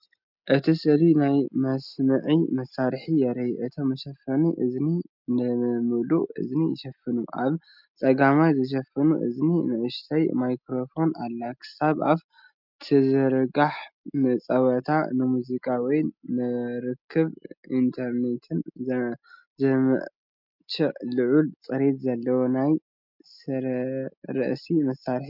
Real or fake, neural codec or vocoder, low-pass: real; none; 5.4 kHz